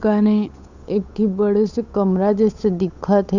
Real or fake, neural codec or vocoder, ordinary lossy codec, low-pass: fake; codec, 16 kHz, 8 kbps, FunCodec, trained on Chinese and English, 25 frames a second; none; 7.2 kHz